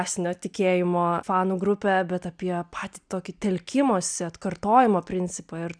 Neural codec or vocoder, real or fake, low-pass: none; real; 9.9 kHz